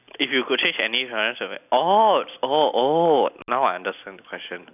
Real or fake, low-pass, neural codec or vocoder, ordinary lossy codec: real; 3.6 kHz; none; none